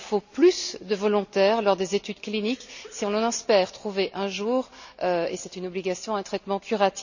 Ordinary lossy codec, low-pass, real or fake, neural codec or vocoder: none; 7.2 kHz; real; none